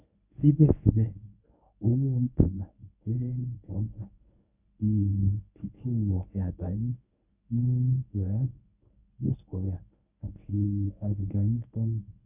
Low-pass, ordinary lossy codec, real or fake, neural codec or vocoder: 3.6 kHz; none; fake; codec, 24 kHz, 0.9 kbps, WavTokenizer, medium speech release version 1